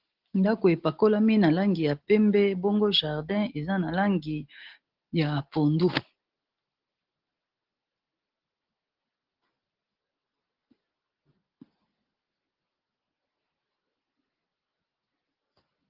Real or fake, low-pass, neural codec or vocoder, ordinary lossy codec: real; 5.4 kHz; none; Opus, 16 kbps